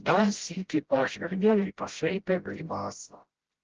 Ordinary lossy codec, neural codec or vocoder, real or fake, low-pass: Opus, 16 kbps; codec, 16 kHz, 0.5 kbps, FreqCodec, smaller model; fake; 7.2 kHz